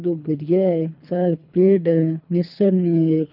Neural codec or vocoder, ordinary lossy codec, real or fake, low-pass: codec, 24 kHz, 3 kbps, HILCodec; none; fake; 5.4 kHz